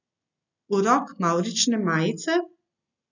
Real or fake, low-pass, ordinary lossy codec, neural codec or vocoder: real; 7.2 kHz; none; none